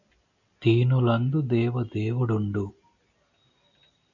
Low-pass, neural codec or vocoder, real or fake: 7.2 kHz; none; real